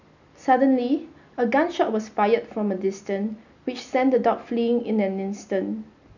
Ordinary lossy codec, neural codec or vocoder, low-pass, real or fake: none; none; 7.2 kHz; real